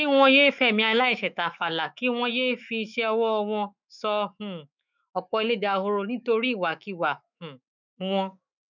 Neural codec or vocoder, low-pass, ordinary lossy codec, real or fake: autoencoder, 48 kHz, 128 numbers a frame, DAC-VAE, trained on Japanese speech; 7.2 kHz; none; fake